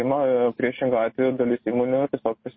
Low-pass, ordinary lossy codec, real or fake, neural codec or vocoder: 7.2 kHz; MP3, 24 kbps; real; none